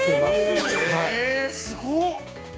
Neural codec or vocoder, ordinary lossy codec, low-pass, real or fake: codec, 16 kHz, 6 kbps, DAC; none; none; fake